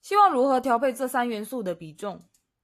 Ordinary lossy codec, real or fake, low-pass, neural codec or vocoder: AAC, 64 kbps; real; 14.4 kHz; none